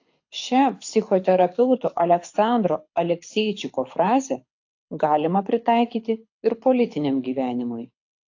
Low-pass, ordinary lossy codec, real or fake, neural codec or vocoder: 7.2 kHz; AAC, 48 kbps; fake; codec, 24 kHz, 6 kbps, HILCodec